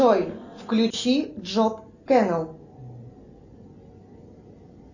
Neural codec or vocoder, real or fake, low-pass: none; real; 7.2 kHz